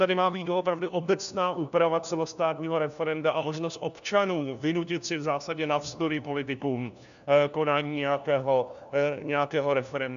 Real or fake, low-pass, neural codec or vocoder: fake; 7.2 kHz; codec, 16 kHz, 1 kbps, FunCodec, trained on LibriTTS, 50 frames a second